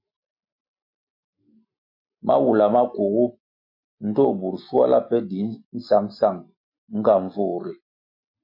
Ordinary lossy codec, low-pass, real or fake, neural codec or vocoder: MP3, 32 kbps; 5.4 kHz; real; none